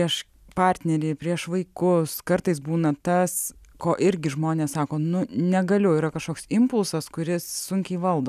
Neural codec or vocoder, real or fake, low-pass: none; real; 14.4 kHz